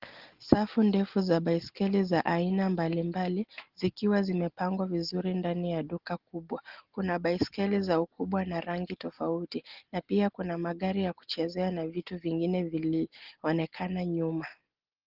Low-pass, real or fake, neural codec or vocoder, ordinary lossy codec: 5.4 kHz; real; none; Opus, 16 kbps